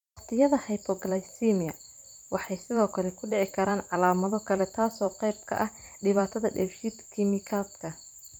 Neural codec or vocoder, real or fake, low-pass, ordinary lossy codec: vocoder, 44.1 kHz, 128 mel bands every 512 samples, BigVGAN v2; fake; 19.8 kHz; none